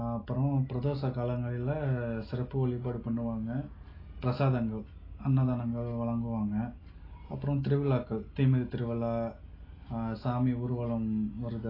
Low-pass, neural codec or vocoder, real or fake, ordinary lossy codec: 5.4 kHz; none; real; AAC, 24 kbps